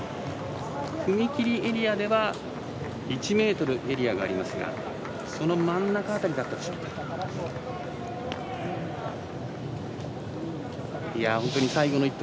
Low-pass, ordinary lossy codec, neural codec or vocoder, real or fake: none; none; none; real